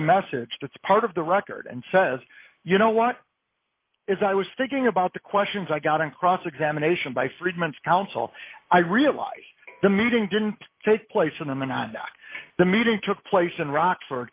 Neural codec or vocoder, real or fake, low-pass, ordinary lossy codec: none; real; 3.6 kHz; Opus, 24 kbps